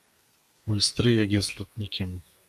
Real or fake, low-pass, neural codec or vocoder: fake; 14.4 kHz; codec, 32 kHz, 1.9 kbps, SNAC